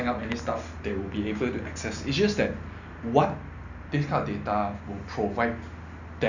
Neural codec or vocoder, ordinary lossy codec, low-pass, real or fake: none; none; 7.2 kHz; real